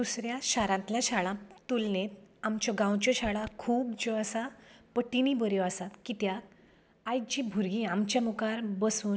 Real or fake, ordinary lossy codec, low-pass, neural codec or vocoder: real; none; none; none